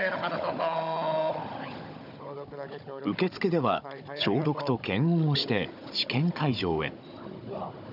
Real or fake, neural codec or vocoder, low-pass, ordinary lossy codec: fake; codec, 16 kHz, 16 kbps, FunCodec, trained on Chinese and English, 50 frames a second; 5.4 kHz; none